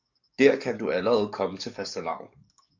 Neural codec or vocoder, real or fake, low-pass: codec, 44.1 kHz, 7.8 kbps, DAC; fake; 7.2 kHz